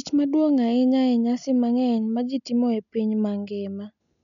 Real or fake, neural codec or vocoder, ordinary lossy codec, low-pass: real; none; none; 7.2 kHz